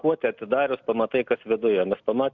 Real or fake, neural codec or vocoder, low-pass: real; none; 7.2 kHz